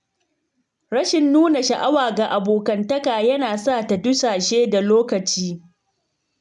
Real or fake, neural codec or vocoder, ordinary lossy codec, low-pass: real; none; none; 10.8 kHz